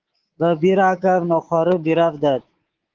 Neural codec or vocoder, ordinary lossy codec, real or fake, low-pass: codec, 24 kHz, 3.1 kbps, DualCodec; Opus, 16 kbps; fake; 7.2 kHz